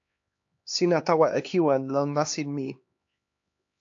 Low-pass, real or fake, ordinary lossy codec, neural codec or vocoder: 7.2 kHz; fake; AAC, 48 kbps; codec, 16 kHz, 2 kbps, X-Codec, HuBERT features, trained on LibriSpeech